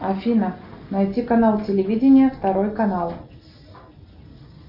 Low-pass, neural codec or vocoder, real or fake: 5.4 kHz; none; real